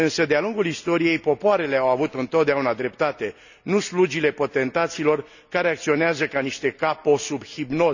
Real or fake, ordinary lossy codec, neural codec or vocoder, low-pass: real; none; none; 7.2 kHz